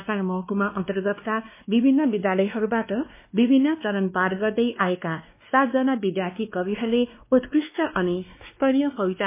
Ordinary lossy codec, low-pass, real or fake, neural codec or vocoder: MP3, 24 kbps; 3.6 kHz; fake; codec, 16 kHz, 2 kbps, X-Codec, WavLM features, trained on Multilingual LibriSpeech